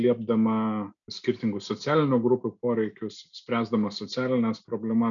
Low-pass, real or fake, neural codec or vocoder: 7.2 kHz; real; none